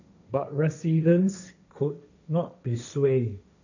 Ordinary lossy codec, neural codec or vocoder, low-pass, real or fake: none; codec, 16 kHz, 1.1 kbps, Voila-Tokenizer; 7.2 kHz; fake